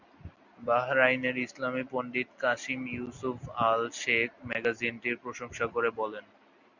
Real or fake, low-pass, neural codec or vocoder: real; 7.2 kHz; none